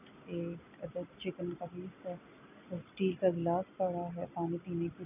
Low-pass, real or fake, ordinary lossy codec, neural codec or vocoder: 3.6 kHz; real; none; none